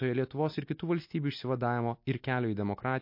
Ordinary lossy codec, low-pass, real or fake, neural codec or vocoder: MP3, 32 kbps; 5.4 kHz; real; none